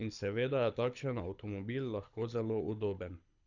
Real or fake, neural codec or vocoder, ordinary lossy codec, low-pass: fake; codec, 24 kHz, 6 kbps, HILCodec; none; 7.2 kHz